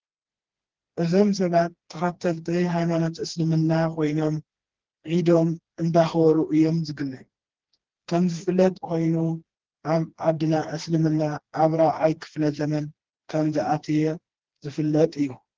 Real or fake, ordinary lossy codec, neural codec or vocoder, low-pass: fake; Opus, 16 kbps; codec, 16 kHz, 2 kbps, FreqCodec, smaller model; 7.2 kHz